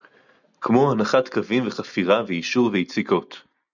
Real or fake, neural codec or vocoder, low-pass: real; none; 7.2 kHz